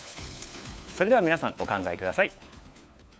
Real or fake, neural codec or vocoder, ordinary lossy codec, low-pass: fake; codec, 16 kHz, 4 kbps, FunCodec, trained on LibriTTS, 50 frames a second; none; none